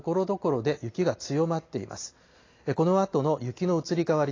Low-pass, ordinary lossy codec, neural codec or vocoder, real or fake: 7.2 kHz; AAC, 32 kbps; none; real